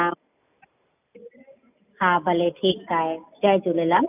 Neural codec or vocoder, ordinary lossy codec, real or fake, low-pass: none; none; real; 3.6 kHz